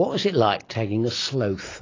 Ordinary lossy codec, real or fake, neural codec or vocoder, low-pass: AAC, 32 kbps; real; none; 7.2 kHz